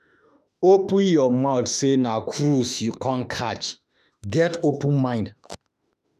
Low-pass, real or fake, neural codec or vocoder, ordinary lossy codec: 14.4 kHz; fake; autoencoder, 48 kHz, 32 numbers a frame, DAC-VAE, trained on Japanese speech; none